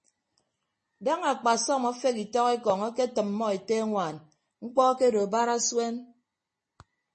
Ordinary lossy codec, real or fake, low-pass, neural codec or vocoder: MP3, 32 kbps; real; 10.8 kHz; none